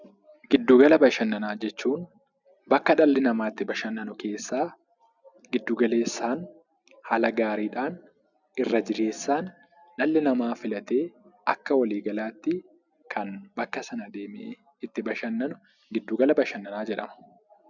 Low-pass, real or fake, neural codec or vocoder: 7.2 kHz; real; none